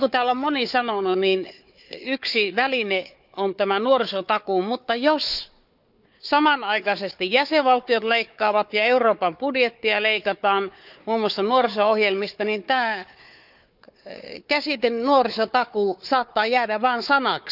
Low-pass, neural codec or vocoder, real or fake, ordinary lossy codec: 5.4 kHz; codec, 16 kHz, 4 kbps, FunCodec, trained on Chinese and English, 50 frames a second; fake; none